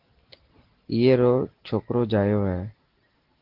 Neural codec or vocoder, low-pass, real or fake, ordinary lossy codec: none; 5.4 kHz; real; Opus, 16 kbps